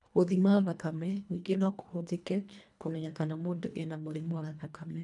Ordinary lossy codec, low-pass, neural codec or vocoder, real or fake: none; 10.8 kHz; codec, 24 kHz, 1.5 kbps, HILCodec; fake